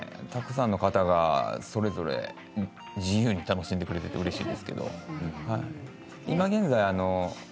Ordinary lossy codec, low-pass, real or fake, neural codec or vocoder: none; none; real; none